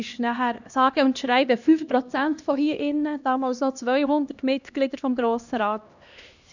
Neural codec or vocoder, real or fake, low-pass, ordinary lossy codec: codec, 16 kHz, 1 kbps, X-Codec, HuBERT features, trained on LibriSpeech; fake; 7.2 kHz; none